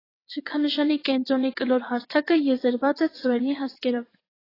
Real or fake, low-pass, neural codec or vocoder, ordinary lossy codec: fake; 5.4 kHz; vocoder, 22.05 kHz, 80 mel bands, WaveNeXt; AAC, 24 kbps